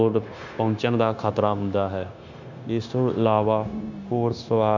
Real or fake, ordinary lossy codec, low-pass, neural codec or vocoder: fake; none; 7.2 kHz; codec, 16 kHz, 0.9 kbps, LongCat-Audio-Codec